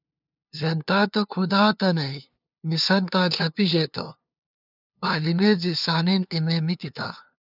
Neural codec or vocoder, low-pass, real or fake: codec, 16 kHz, 2 kbps, FunCodec, trained on LibriTTS, 25 frames a second; 5.4 kHz; fake